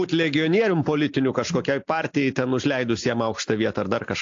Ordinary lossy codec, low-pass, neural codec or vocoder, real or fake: AAC, 48 kbps; 7.2 kHz; none; real